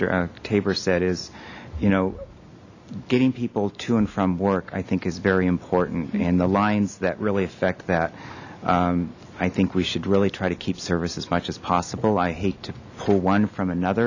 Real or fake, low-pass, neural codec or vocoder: real; 7.2 kHz; none